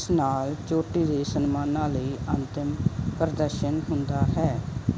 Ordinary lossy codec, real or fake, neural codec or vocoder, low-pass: none; real; none; none